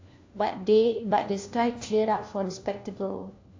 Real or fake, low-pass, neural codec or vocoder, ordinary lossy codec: fake; 7.2 kHz; codec, 16 kHz, 1 kbps, FunCodec, trained on LibriTTS, 50 frames a second; AAC, 48 kbps